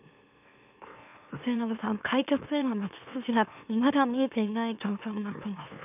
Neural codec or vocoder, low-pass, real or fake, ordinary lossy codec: autoencoder, 44.1 kHz, a latent of 192 numbers a frame, MeloTTS; 3.6 kHz; fake; none